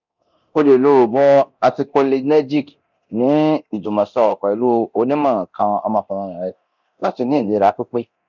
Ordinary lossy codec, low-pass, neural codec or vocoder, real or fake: none; 7.2 kHz; codec, 24 kHz, 0.9 kbps, DualCodec; fake